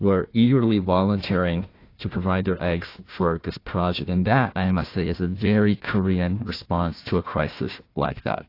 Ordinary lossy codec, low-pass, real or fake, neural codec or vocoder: AAC, 32 kbps; 5.4 kHz; fake; codec, 16 kHz, 1 kbps, FunCodec, trained on Chinese and English, 50 frames a second